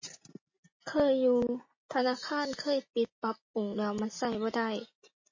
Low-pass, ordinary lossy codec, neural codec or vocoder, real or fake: 7.2 kHz; MP3, 32 kbps; none; real